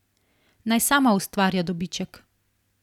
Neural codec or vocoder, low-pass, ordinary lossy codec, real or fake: none; 19.8 kHz; none; real